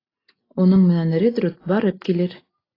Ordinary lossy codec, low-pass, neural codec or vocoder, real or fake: AAC, 24 kbps; 5.4 kHz; none; real